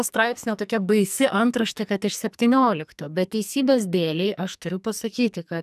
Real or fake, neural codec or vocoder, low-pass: fake; codec, 44.1 kHz, 2.6 kbps, SNAC; 14.4 kHz